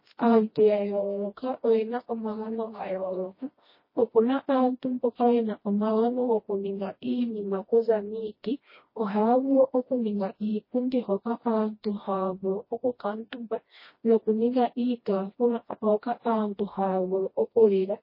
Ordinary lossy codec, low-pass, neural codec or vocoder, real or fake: MP3, 24 kbps; 5.4 kHz; codec, 16 kHz, 1 kbps, FreqCodec, smaller model; fake